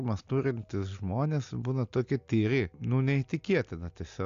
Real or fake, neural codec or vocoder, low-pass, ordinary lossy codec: real; none; 7.2 kHz; AAC, 64 kbps